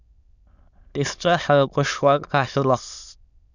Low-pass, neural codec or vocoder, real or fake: 7.2 kHz; autoencoder, 22.05 kHz, a latent of 192 numbers a frame, VITS, trained on many speakers; fake